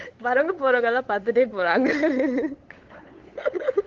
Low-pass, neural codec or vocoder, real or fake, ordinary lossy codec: 7.2 kHz; codec, 16 kHz, 8 kbps, FunCodec, trained on LibriTTS, 25 frames a second; fake; Opus, 16 kbps